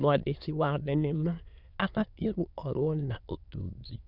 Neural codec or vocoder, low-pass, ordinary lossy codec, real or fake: autoencoder, 22.05 kHz, a latent of 192 numbers a frame, VITS, trained on many speakers; 5.4 kHz; none; fake